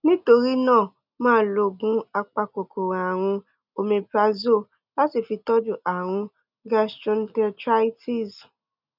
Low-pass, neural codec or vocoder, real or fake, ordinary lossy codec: 5.4 kHz; none; real; none